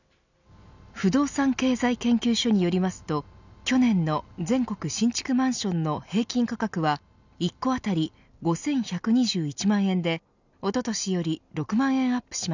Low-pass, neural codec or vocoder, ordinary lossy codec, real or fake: 7.2 kHz; none; none; real